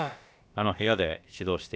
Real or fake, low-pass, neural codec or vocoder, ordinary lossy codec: fake; none; codec, 16 kHz, about 1 kbps, DyCAST, with the encoder's durations; none